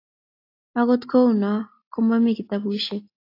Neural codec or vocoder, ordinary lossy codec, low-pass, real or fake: none; AAC, 32 kbps; 5.4 kHz; real